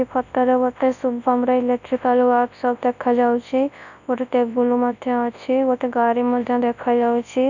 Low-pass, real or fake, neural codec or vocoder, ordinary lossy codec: 7.2 kHz; fake; codec, 24 kHz, 0.9 kbps, WavTokenizer, large speech release; none